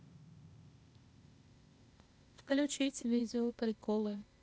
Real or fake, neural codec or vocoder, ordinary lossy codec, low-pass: fake; codec, 16 kHz, 0.8 kbps, ZipCodec; none; none